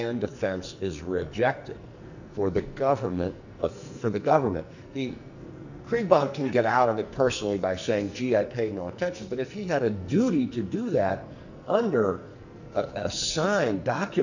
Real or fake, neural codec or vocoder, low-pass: fake; codec, 44.1 kHz, 2.6 kbps, SNAC; 7.2 kHz